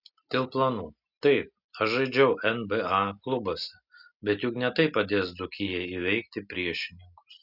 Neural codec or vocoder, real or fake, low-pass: none; real; 5.4 kHz